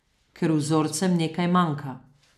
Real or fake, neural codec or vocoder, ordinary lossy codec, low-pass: real; none; none; 14.4 kHz